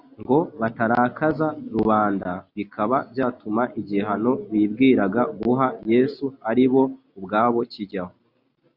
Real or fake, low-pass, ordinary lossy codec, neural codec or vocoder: real; 5.4 kHz; Opus, 64 kbps; none